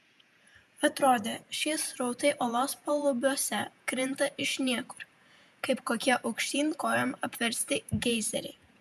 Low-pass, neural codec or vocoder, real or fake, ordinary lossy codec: 14.4 kHz; vocoder, 48 kHz, 128 mel bands, Vocos; fake; MP3, 96 kbps